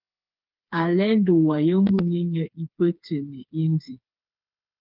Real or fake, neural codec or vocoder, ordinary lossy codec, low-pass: fake; codec, 16 kHz, 4 kbps, FreqCodec, smaller model; Opus, 24 kbps; 5.4 kHz